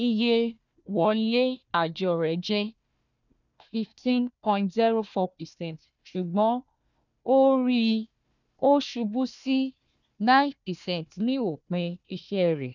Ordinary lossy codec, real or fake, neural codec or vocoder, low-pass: none; fake; codec, 16 kHz, 1 kbps, FunCodec, trained on Chinese and English, 50 frames a second; 7.2 kHz